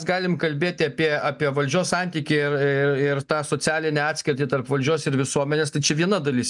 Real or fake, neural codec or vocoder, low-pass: real; none; 10.8 kHz